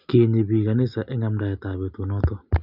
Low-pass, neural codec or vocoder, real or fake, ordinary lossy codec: 5.4 kHz; none; real; none